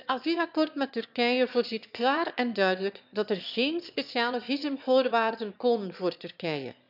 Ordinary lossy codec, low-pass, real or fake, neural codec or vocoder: none; 5.4 kHz; fake; autoencoder, 22.05 kHz, a latent of 192 numbers a frame, VITS, trained on one speaker